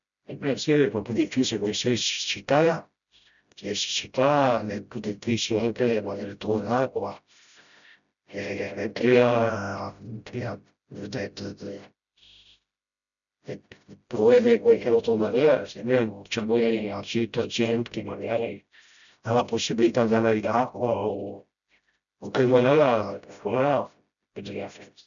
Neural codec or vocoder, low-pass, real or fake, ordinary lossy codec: codec, 16 kHz, 0.5 kbps, FreqCodec, smaller model; 7.2 kHz; fake; none